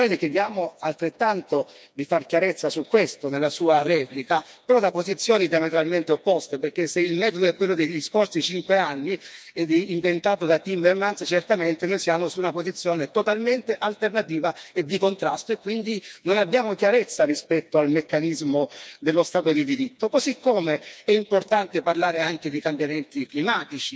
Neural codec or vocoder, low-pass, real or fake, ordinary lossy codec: codec, 16 kHz, 2 kbps, FreqCodec, smaller model; none; fake; none